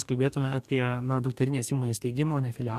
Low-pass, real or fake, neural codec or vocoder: 14.4 kHz; fake; codec, 44.1 kHz, 2.6 kbps, DAC